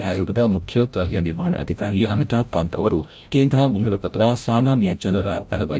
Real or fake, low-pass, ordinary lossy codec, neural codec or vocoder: fake; none; none; codec, 16 kHz, 0.5 kbps, FreqCodec, larger model